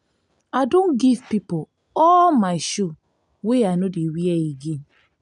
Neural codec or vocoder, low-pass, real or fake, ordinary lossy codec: none; 10.8 kHz; real; none